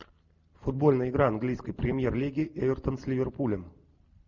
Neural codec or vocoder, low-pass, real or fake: none; 7.2 kHz; real